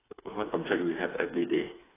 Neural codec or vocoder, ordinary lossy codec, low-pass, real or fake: codec, 16 kHz, 4 kbps, FreqCodec, smaller model; AAC, 16 kbps; 3.6 kHz; fake